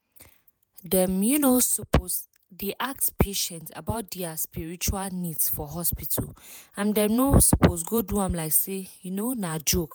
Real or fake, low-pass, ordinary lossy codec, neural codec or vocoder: fake; none; none; vocoder, 48 kHz, 128 mel bands, Vocos